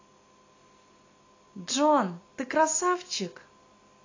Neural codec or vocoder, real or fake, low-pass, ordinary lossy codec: none; real; 7.2 kHz; AAC, 32 kbps